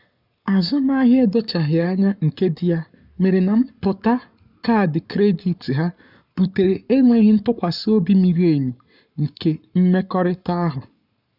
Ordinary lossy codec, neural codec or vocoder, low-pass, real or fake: none; codec, 44.1 kHz, 7.8 kbps, Pupu-Codec; 5.4 kHz; fake